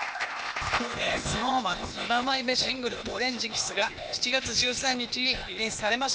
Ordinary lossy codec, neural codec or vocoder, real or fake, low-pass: none; codec, 16 kHz, 0.8 kbps, ZipCodec; fake; none